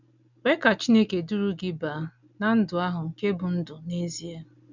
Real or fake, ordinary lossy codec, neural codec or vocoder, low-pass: real; none; none; 7.2 kHz